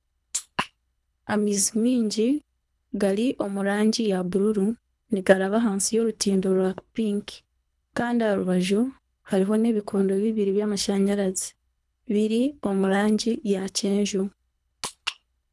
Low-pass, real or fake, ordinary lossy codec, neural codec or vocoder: none; fake; none; codec, 24 kHz, 3 kbps, HILCodec